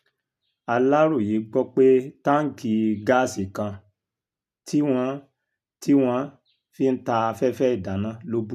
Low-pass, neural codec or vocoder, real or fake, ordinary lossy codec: 14.4 kHz; none; real; none